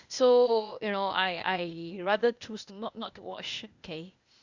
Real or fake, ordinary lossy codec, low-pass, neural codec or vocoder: fake; Opus, 64 kbps; 7.2 kHz; codec, 16 kHz, 0.8 kbps, ZipCodec